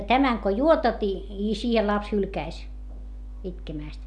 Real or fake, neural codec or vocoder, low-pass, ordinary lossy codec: real; none; none; none